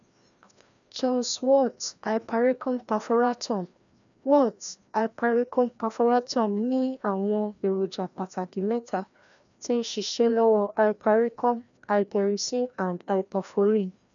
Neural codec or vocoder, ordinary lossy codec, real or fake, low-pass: codec, 16 kHz, 1 kbps, FreqCodec, larger model; none; fake; 7.2 kHz